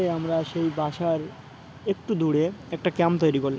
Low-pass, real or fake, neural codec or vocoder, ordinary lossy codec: none; real; none; none